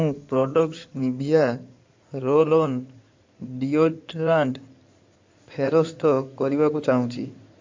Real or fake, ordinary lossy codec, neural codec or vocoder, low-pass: fake; MP3, 48 kbps; codec, 16 kHz in and 24 kHz out, 2.2 kbps, FireRedTTS-2 codec; 7.2 kHz